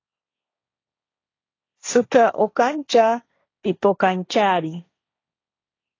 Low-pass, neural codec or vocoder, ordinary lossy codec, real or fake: 7.2 kHz; codec, 16 kHz, 1.1 kbps, Voila-Tokenizer; AAC, 32 kbps; fake